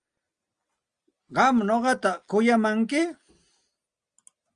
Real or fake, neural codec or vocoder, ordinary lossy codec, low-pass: real; none; Opus, 32 kbps; 9.9 kHz